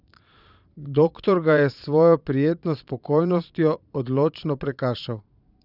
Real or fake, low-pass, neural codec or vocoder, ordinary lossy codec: fake; 5.4 kHz; vocoder, 22.05 kHz, 80 mel bands, Vocos; none